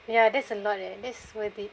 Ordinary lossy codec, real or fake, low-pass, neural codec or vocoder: none; real; none; none